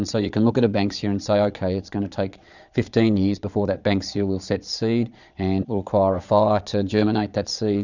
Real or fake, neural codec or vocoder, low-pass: fake; vocoder, 22.05 kHz, 80 mel bands, Vocos; 7.2 kHz